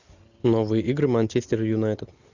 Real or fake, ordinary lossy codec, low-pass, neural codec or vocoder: real; Opus, 64 kbps; 7.2 kHz; none